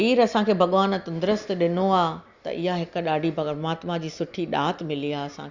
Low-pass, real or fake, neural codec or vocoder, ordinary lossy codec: 7.2 kHz; real; none; Opus, 64 kbps